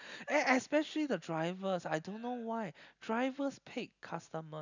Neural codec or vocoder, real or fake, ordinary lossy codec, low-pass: none; real; none; 7.2 kHz